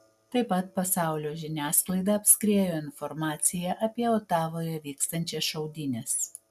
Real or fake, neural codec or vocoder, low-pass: real; none; 14.4 kHz